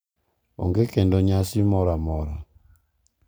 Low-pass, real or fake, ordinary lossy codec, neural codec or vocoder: none; real; none; none